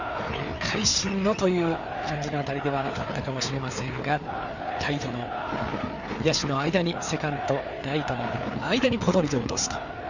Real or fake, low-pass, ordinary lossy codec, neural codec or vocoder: fake; 7.2 kHz; none; codec, 16 kHz, 4 kbps, FreqCodec, larger model